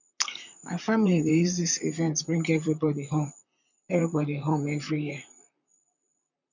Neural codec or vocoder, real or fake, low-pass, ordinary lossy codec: vocoder, 44.1 kHz, 128 mel bands, Pupu-Vocoder; fake; 7.2 kHz; none